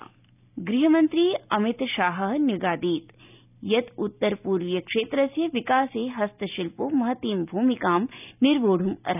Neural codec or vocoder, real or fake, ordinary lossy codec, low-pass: none; real; none; 3.6 kHz